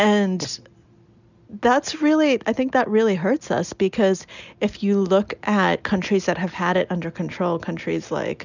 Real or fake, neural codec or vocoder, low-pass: real; none; 7.2 kHz